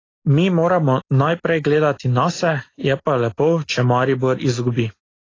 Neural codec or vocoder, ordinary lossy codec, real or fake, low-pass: none; AAC, 32 kbps; real; 7.2 kHz